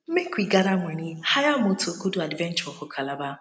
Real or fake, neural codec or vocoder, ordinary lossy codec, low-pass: real; none; none; none